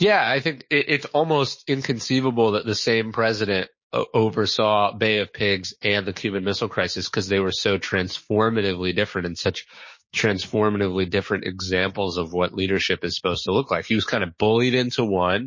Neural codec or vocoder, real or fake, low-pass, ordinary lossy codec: codec, 16 kHz, 6 kbps, DAC; fake; 7.2 kHz; MP3, 32 kbps